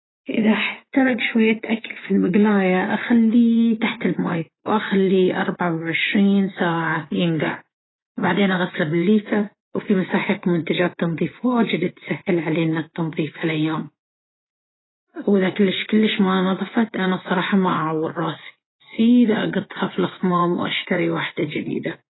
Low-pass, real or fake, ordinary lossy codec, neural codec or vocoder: 7.2 kHz; fake; AAC, 16 kbps; vocoder, 24 kHz, 100 mel bands, Vocos